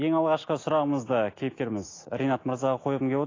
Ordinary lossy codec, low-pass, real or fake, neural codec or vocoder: AAC, 32 kbps; 7.2 kHz; real; none